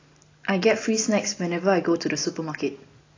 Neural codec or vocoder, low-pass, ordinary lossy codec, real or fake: none; 7.2 kHz; AAC, 32 kbps; real